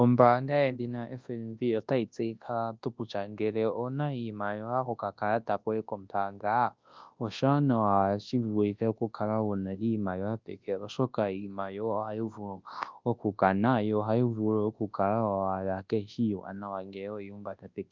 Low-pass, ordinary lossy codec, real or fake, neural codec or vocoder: 7.2 kHz; Opus, 32 kbps; fake; codec, 24 kHz, 0.9 kbps, WavTokenizer, large speech release